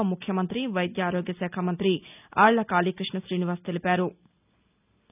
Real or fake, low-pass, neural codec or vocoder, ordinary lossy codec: real; 3.6 kHz; none; none